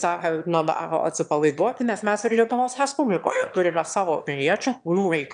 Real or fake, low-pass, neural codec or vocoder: fake; 9.9 kHz; autoencoder, 22.05 kHz, a latent of 192 numbers a frame, VITS, trained on one speaker